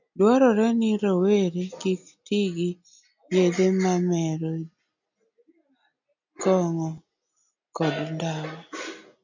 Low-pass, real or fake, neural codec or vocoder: 7.2 kHz; real; none